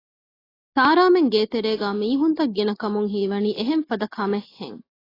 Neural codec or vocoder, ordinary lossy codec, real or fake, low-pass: none; AAC, 24 kbps; real; 5.4 kHz